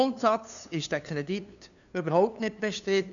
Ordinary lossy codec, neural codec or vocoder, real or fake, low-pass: none; codec, 16 kHz, 2 kbps, FunCodec, trained on LibriTTS, 25 frames a second; fake; 7.2 kHz